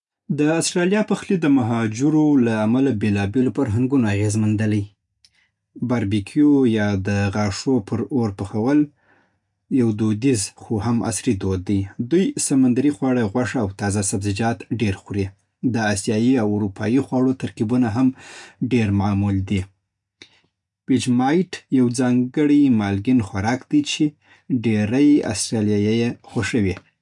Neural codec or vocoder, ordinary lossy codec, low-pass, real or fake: none; none; 10.8 kHz; real